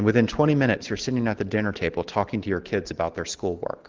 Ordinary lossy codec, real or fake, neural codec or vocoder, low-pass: Opus, 16 kbps; real; none; 7.2 kHz